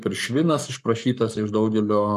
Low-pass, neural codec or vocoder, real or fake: 14.4 kHz; codec, 44.1 kHz, 7.8 kbps, Pupu-Codec; fake